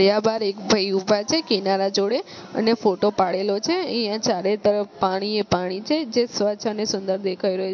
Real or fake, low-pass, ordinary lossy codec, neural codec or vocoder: real; 7.2 kHz; MP3, 48 kbps; none